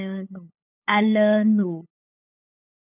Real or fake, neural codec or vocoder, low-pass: fake; codec, 16 kHz, 8 kbps, FunCodec, trained on LibriTTS, 25 frames a second; 3.6 kHz